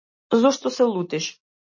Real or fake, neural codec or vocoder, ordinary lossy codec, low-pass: real; none; MP3, 32 kbps; 7.2 kHz